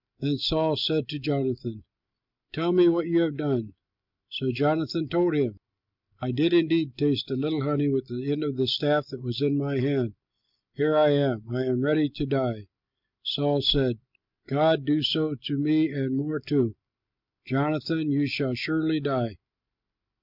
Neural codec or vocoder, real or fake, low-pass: none; real; 5.4 kHz